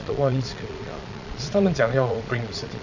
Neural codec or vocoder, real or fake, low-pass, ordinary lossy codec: vocoder, 22.05 kHz, 80 mel bands, Vocos; fake; 7.2 kHz; none